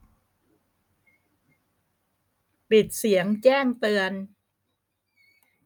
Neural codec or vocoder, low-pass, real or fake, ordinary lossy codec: none; none; real; none